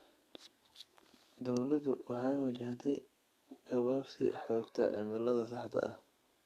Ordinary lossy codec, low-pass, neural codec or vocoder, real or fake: Opus, 64 kbps; 14.4 kHz; codec, 32 kHz, 1.9 kbps, SNAC; fake